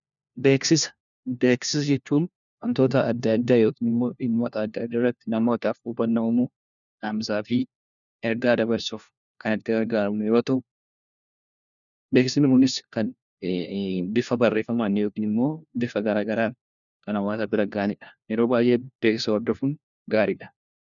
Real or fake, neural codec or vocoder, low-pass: fake; codec, 16 kHz, 1 kbps, FunCodec, trained on LibriTTS, 50 frames a second; 7.2 kHz